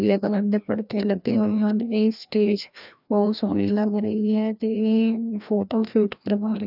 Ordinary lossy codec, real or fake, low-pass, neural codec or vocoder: none; fake; 5.4 kHz; codec, 16 kHz, 1 kbps, FreqCodec, larger model